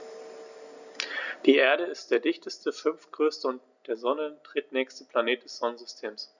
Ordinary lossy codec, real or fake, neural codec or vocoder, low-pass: none; real; none; 7.2 kHz